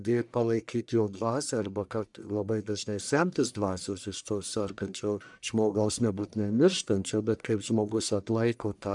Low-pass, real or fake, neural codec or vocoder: 10.8 kHz; fake; codec, 44.1 kHz, 1.7 kbps, Pupu-Codec